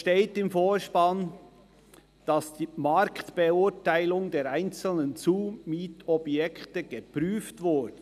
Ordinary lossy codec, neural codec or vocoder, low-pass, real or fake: none; none; 14.4 kHz; real